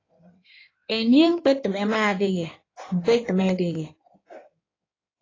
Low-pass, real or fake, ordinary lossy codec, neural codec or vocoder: 7.2 kHz; fake; AAC, 32 kbps; codec, 16 kHz in and 24 kHz out, 1.1 kbps, FireRedTTS-2 codec